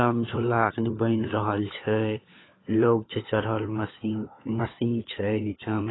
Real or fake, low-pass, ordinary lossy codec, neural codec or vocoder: fake; 7.2 kHz; AAC, 16 kbps; codec, 16 kHz, 4 kbps, FunCodec, trained on LibriTTS, 50 frames a second